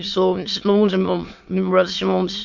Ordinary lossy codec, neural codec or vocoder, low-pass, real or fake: MP3, 48 kbps; autoencoder, 22.05 kHz, a latent of 192 numbers a frame, VITS, trained on many speakers; 7.2 kHz; fake